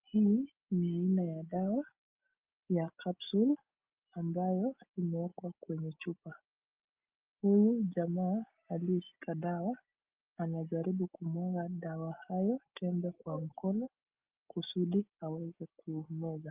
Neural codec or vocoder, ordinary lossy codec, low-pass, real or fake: none; Opus, 32 kbps; 3.6 kHz; real